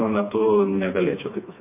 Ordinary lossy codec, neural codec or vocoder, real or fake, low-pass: MP3, 24 kbps; codec, 16 kHz, 2 kbps, FreqCodec, smaller model; fake; 3.6 kHz